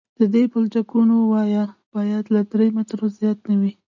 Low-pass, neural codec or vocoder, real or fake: 7.2 kHz; none; real